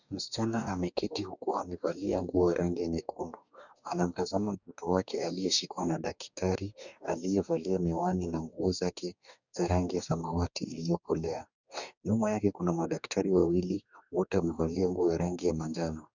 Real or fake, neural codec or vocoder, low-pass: fake; codec, 44.1 kHz, 2.6 kbps, DAC; 7.2 kHz